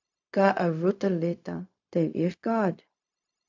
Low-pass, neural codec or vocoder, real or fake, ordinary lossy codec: 7.2 kHz; codec, 16 kHz, 0.4 kbps, LongCat-Audio-Codec; fake; AAC, 48 kbps